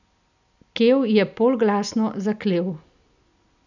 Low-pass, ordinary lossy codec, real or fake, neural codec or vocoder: 7.2 kHz; none; real; none